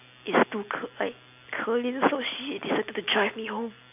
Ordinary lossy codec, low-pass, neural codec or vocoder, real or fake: AAC, 32 kbps; 3.6 kHz; none; real